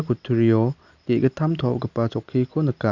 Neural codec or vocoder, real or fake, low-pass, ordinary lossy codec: none; real; 7.2 kHz; Opus, 64 kbps